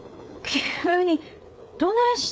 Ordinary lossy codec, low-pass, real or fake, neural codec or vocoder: none; none; fake; codec, 16 kHz, 4 kbps, FreqCodec, larger model